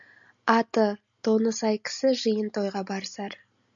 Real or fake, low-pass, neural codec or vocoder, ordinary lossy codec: real; 7.2 kHz; none; MP3, 96 kbps